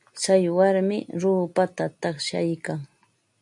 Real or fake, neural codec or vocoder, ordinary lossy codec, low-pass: real; none; MP3, 64 kbps; 10.8 kHz